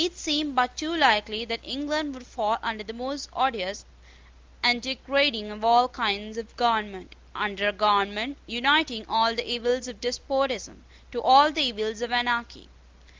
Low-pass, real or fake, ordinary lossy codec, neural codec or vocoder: 7.2 kHz; real; Opus, 32 kbps; none